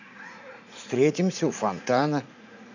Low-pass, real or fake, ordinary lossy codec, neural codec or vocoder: 7.2 kHz; fake; none; vocoder, 44.1 kHz, 80 mel bands, Vocos